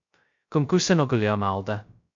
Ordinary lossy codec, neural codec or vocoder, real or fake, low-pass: MP3, 48 kbps; codec, 16 kHz, 0.2 kbps, FocalCodec; fake; 7.2 kHz